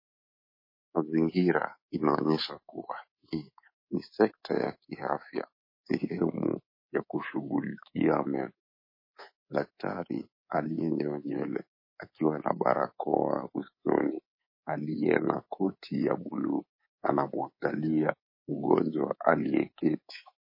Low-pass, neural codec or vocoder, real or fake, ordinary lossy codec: 5.4 kHz; codec, 24 kHz, 3.1 kbps, DualCodec; fake; MP3, 24 kbps